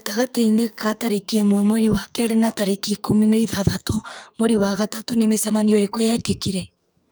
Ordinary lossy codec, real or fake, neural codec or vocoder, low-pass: none; fake; codec, 44.1 kHz, 2.6 kbps, SNAC; none